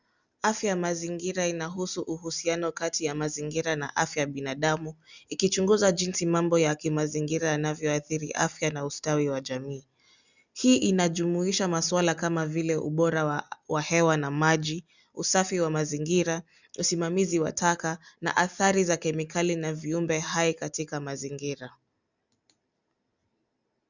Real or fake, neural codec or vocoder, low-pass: real; none; 7.2 kHz